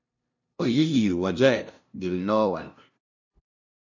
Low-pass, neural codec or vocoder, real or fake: 7.2 kHz; codec, 16 kHz, 0.5 kbps, FunCodec, trained on LibriTTS, 25 frames a second; fake